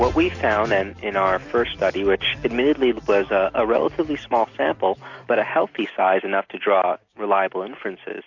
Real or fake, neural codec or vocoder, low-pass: real; none; 7.2 kHz